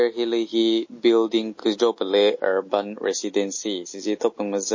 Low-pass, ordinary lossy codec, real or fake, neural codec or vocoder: 7.2 kHz; MP3, 32 kbps; real; none